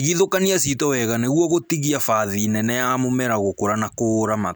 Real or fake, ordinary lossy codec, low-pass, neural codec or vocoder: real; none; none; none